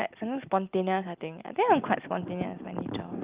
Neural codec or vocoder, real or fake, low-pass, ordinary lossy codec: none; real; 3.6 kHz; Opus, 32 kbps